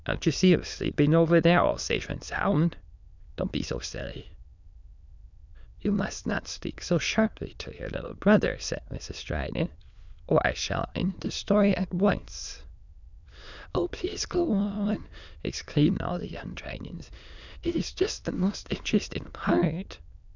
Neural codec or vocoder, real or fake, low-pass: autoencoder, 22.05 kHz, a latent of 192 numbers a frame, VITS, trained on many speakers; fake; 7.2 kHz